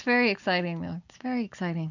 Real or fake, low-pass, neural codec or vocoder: real; 7.2 kHz; none